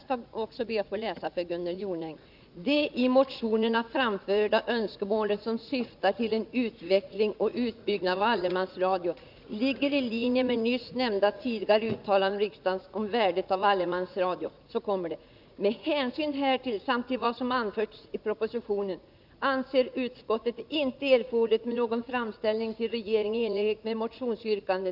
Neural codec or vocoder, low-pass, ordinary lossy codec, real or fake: vocoder, 44.1 kHz, 80 mel bands, Vocos; 5.4 kHz; none; fake